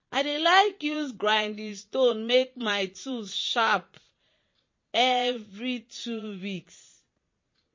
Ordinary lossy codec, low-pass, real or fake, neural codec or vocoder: MP3, 32 kbps; 7.2 kHz; fake; vocoder, 22.05 kHz, 80 mel bands, Vocos